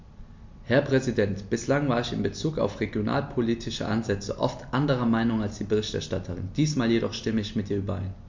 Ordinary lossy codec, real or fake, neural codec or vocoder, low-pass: MP3, 48 kbps; real; none; 7.2 kHz